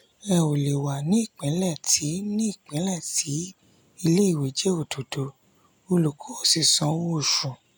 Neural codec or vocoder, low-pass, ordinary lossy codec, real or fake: none; none; none; real